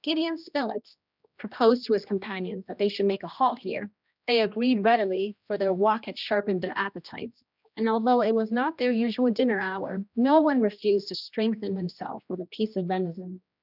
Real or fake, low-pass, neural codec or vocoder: fake; 5.4 kHz; codec, 16 kHz, 1 kbps, X-Codec, HuBERT features, trained on general audio